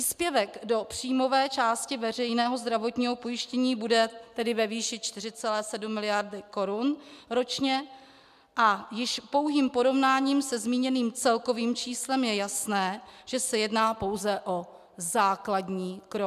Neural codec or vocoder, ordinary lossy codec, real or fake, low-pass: none; MP3, 96 kbps; real; 14.4 kHz